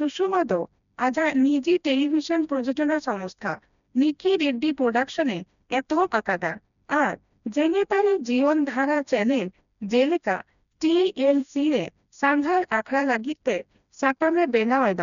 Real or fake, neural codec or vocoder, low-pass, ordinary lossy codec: fake; codec, 16 kHz, 1 kbps, FreqCodec, smaller model; 7.2 kHz; none